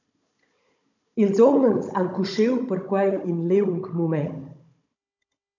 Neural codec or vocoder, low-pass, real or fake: codec, 16 kHz, 16 kbps, FunCodec, trained on Chinese and English, 50 frames a second; 7.2 kHz; fake